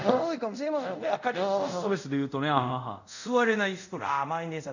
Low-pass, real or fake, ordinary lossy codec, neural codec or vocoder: 7.2 kHz; fake; none; codec, 24 kHz, 0.5 kbps, DualCodec